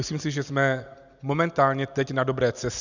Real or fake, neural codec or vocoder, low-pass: real; none; 7.2 kHz